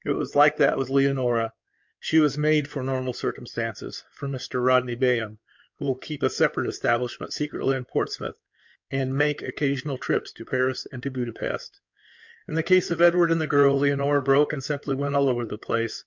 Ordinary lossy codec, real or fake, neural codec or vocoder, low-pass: MP3, 64 kbps; fake; codec, 16 kHz in and 24 kHz out, 2.2 kbps, FireRedTTS-2 codec; 7.2 kHz